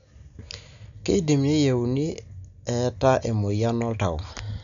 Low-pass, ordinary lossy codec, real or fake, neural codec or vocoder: 7.2 kHz; none; real; none